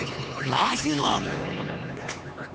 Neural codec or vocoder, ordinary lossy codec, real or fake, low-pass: codec, 16 kHz, 2 kbps, X-Codec, HuBERT features, trained on LibriSpeech; none; fake; none